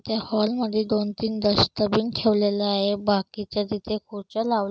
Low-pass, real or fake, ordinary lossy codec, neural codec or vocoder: none; real; none; none